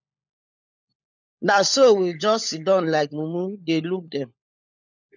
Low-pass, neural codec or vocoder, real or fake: 7.2 kHz; codec, 16 kHz, 16 kbps, FunCodec, trained on LibriTTS, 50 frames a second; fake